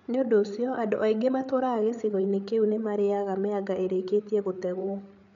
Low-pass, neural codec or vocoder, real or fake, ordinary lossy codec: 7.2 kHz; codec, 16 kHz, 8 kbps, FreqCodec, larger model; fake; none